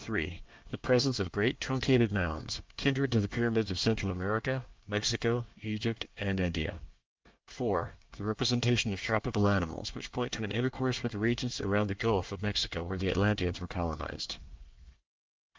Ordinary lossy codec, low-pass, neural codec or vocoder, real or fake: Opus, 24 kbps; 7.2 kHz; codec, 24 kHz, 1 kbps, SNAC; fake